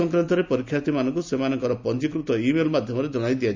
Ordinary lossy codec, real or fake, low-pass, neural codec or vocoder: MP3, 64 kbps; real; 7.2 kHz; none